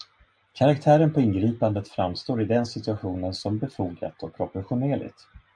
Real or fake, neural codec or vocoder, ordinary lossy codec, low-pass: real; none; MP3, 96 kbps; 9.9 kHz